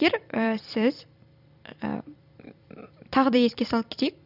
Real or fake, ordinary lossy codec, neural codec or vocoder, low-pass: fake; none; vocoder, 44.1 kHz, 128 mel bands every 256 samples, BigVGAN v2; 5.4 kHz